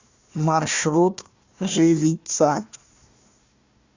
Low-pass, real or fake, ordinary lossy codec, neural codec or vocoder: 7.2 kHz; fake; Opus, 64 kbps; codec, 16 kHz, 1 kbps, FunCodec, trained on Chinese and English, 50 frames a second